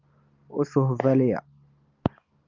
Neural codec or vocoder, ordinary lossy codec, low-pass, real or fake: none; Opus, 32 kbps; 7.2 kHz; real